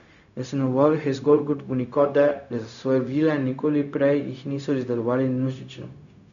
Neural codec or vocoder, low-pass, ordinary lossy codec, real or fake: codec, 16 kHz, 0.4 kbps, LongCat-Audio-Codec; 7.2 kHz; none; fake